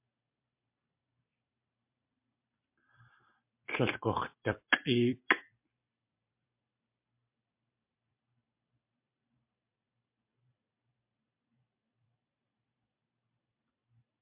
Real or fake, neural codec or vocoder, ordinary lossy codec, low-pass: fake; vocoder, 44.1 kHz, 128 mel bands every 256 samples, BigVGAN v2; MP3, 32 kbps; 3.6 kHz